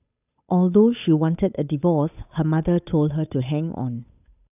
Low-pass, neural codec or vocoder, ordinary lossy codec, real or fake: 3.6 kHz; codec, 16 kHz, 8 kbps, FunCodec, trained on Chinese and English, 25 frames a second; none; fake